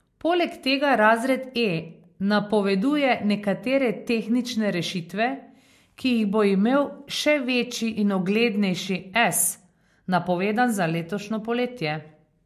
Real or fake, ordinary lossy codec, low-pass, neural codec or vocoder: real; MP3, 64 kbps; 14.4 kHz; none